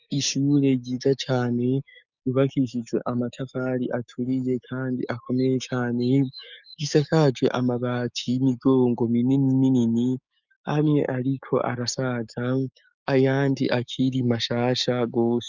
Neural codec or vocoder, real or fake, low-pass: codec, 16 kHz, 6 kbps, DAC; fake; 7.2 kHz